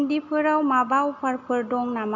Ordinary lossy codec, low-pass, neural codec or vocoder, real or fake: none; 7.2 kHz; none; real